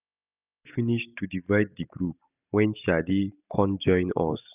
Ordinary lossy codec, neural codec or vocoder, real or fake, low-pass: none; none; real; 3.6 kHz